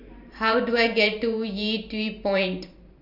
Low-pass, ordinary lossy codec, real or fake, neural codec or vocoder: 5.4 kHz; none; fake; vocoder, 44.1 kHz, 128 mel bands every 512 samples, BigVGAN v2